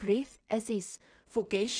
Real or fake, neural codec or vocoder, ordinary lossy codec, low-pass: fake; codec, 16 kHz in and 24 kHz out, 0.4 kbps, LongCat-Audio-Codec, two codebook decoder; none; 9.9 kHz